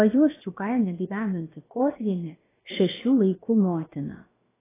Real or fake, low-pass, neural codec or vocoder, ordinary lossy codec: fake; 3.6 kHz; codec, 16 kHz, about 1 kbps, DyCAST, with the encoder's durations; AAC, 16 kbps